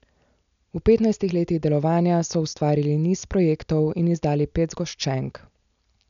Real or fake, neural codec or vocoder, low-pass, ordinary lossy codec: real; none; 7.2 kHz; none